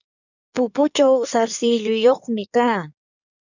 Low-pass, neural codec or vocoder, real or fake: 7.2 kHz; codec, 16 kHz in and 24 kHz out, 1.1 kbps, FireRedTTS-2 codec; fake